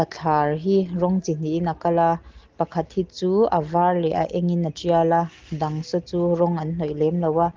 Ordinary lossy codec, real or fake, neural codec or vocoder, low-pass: Opus, 16 kbps; real; none; 7.2 kHz